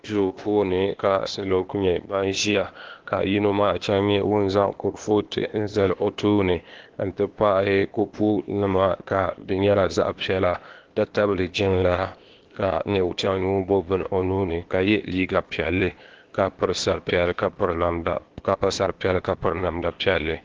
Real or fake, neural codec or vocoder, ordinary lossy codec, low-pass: fake; codec, 16 kHz, 0.8 kbps, ZipCodec; Opus, 16 kbps; 7.2 kHz